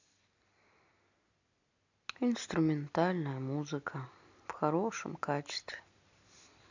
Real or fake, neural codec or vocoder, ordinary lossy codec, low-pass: real; none; AAC, 48 kbps; 7.2 kHz